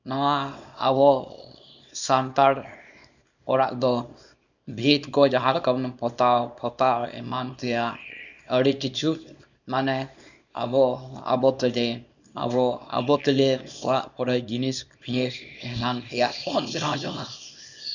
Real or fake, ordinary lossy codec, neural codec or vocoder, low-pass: fake; none; codec, 24 kHz, 0.9 kbps, WavTokenizer, small release; 7.2 kHz